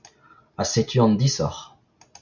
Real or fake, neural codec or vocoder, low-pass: real; none; 7.2 kHz